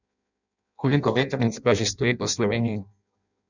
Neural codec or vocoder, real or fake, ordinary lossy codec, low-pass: codec, 16 kHz in and 24 kHz out, 0.6 kbps, FireRedTTS-2 codec; fake; none; 7.2 kHz